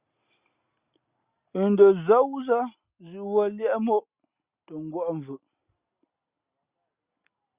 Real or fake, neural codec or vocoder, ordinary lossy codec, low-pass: real; none; Opus, 64 kbps; 3.6 kHz